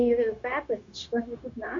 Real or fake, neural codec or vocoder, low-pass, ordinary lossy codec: fake; codec, 16 kHz, 0.9 kbps, LongCat-Audio-Codec; 7.2 kHz; AAC, 64 kbps